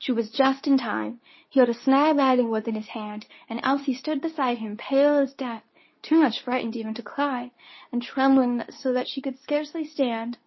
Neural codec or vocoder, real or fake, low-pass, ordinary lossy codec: codec, 24 kHz, 0.9 kbps, WavTokenizer, medium speech release version 2; fake; 7.2 kHz; MP3, 24 kbps